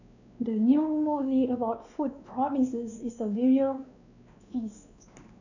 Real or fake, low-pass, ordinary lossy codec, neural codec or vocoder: fake; 7.2 kHz; none; codec, 16 kHz, 2 kbps, X-Codec, WavLM features, trained on Multilingual LibriSpeech